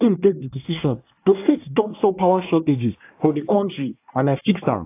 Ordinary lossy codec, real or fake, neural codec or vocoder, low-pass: AAC, 24 kbps; fake; codec, 24 kHz, 1 kbps, SNAC; 3.6 kHz